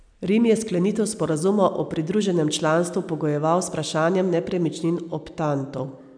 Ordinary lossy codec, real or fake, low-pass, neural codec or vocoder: none; real; 9.9 kHz; none